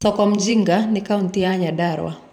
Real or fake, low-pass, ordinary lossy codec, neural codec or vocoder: fake; 19.8 kHz; none; vocoder, 44.1 kHz, 128 mel bands every 512 samples, BigVGAN v2